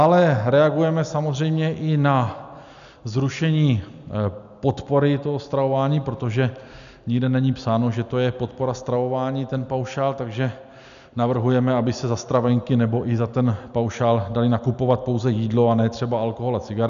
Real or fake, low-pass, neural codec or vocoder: real; 7.2 kHz; none